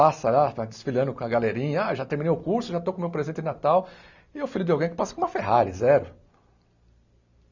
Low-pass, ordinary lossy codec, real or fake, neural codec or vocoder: 7.2 kHz; none; real; none